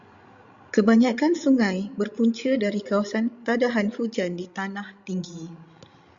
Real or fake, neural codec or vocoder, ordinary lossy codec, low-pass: fake; codec, 16 kHz, 16 kbps, FreqCodec, larger model; Opus, 64 kbps; 7.2 kHz